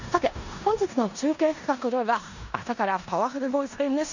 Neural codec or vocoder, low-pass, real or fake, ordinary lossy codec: codec, 16 kHz in and 24 kHz out, 0.9 kbps, LongCat-Audio-Codec, four codebook decoder; 7.2 kHz; fake; none